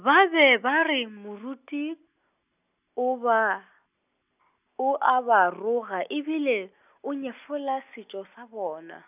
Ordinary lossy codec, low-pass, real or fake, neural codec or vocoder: none; 3.6 kHz; real; none